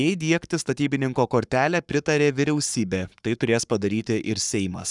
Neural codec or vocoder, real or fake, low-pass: codec, 44.1 kHz, 7.8 kbps, Pupu-Codec; fake; 10.8 kHz